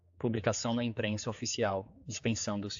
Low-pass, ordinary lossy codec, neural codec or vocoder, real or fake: 7.2 kHz; AAC, 64 kbps; codec, 16 kHz, 4 kbps, X-Codec, HuBERT features, trained on general audio; fake